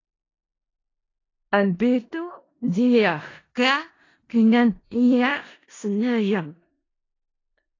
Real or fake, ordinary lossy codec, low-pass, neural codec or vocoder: fake; AAC, 32 kbps; 7.2 kHz; codec, 16 kHz in and 24 kHz out, 0.4 kbps, LongCat-Audio-Codec, four codebook decoder